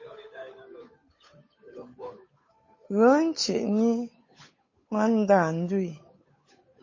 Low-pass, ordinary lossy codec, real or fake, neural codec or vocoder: 7.2 kHz; MP3, 32 kbps; fake; codec, 16 kHz, 8 kbps, FunCodec, trained on Chinese and English, 25 frames a second